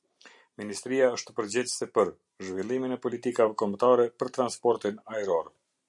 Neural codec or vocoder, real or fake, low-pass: none; real; 10.8 kHz